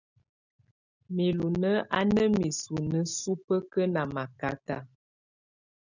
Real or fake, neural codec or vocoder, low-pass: real; none; 7.2 kHz